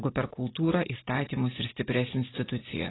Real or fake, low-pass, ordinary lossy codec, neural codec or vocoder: real; 7.2 kHz; AAC, 16 kbps; none